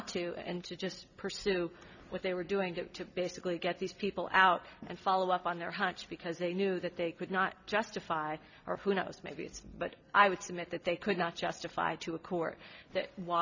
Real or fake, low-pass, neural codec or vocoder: real; 7.2 kHz; none